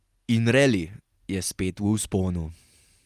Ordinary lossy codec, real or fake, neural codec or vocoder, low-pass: Opus, 32 kbps; real; none; 14.4 kHz